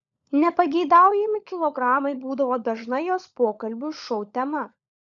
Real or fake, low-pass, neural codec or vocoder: fake; 7.2 kHz; codec, 16 kHz, 16 kbps, FunCodec, trained on LibriTTS, 50 frames a second